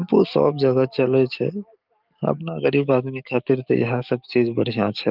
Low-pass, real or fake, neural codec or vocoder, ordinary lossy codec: 5.4 kHz; fake; codec, 44.1 kHz, 7.8 kbps, DAC; Opus, 24 kbps